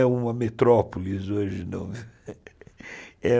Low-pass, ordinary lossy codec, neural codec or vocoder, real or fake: none; none; none; real